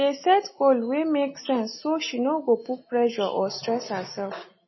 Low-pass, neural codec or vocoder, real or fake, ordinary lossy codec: 7.2 kHz; none; real; MP3, 24 kbps